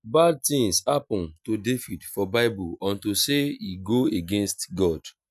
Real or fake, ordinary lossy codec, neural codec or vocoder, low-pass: real; none; none; 14.4 kHz